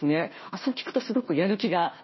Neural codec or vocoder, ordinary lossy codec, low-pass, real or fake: codec, 16 kHz, 1 kbps, FunCodec, trained on Chinese and English, 50 frames a second; MP3, 24 kbps; 7.2 kHz; fake